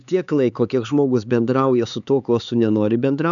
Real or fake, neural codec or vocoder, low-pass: fake; codec, 16 kHz, 4 kbps, X-Codec, HuBERT features, trained on LibriSpeech; 7.2 kHz